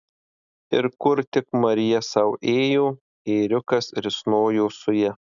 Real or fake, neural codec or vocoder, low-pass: real; none; 7.2 kHz